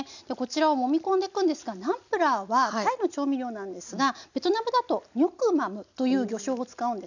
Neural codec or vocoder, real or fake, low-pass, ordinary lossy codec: none; real; 7.2 kHz; none